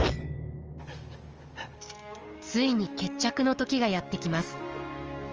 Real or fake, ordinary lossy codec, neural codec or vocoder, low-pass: fake; Opus, 24 kbps; codec, 44.1 kHz, 7.8 kbps, DAC; 7.2 kHz